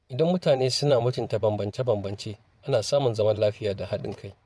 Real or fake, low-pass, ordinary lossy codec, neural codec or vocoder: fake; none; none; vocoder, 22.05 kHz, 80 mel bands, WaveNeXt